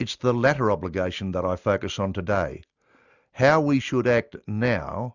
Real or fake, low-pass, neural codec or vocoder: real; 7.2 kHz; none